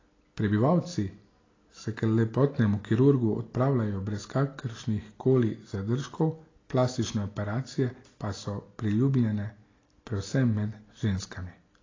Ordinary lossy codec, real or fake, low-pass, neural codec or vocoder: AAC, 32 kbps; real; 7.2 kHz; none